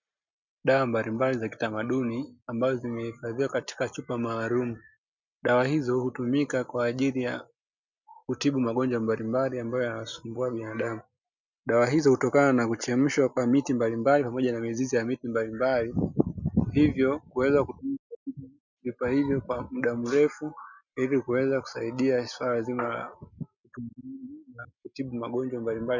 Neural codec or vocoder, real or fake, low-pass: none; real; 7.2 kHz